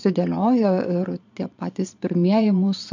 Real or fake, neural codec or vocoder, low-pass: fake; vocoder, 22.05 kHz, 80 mel bands, Vocos; 7.2 kHz